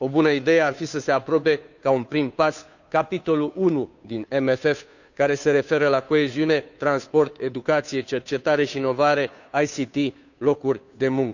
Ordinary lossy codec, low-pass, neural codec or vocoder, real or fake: none; 7.2 kHz; codec, 16 kHz, 2 kbps, FunCodec, trained on Chinese and English, 25 frames a second; fake